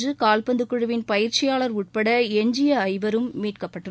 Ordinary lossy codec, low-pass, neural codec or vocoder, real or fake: none; none; none; real